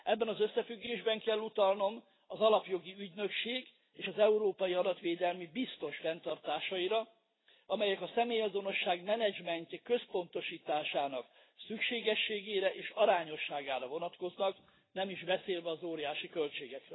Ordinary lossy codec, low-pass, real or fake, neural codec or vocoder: AAC, 16 kbps; 7.2 kHz; real; none